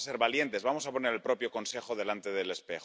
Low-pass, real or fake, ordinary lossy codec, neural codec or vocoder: none; real; none; none